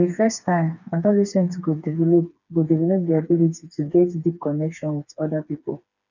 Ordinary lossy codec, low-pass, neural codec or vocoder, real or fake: none; 7.2 kHz; codec, 16 kHz, 4 kbps, FreqCodec, smaller model; fake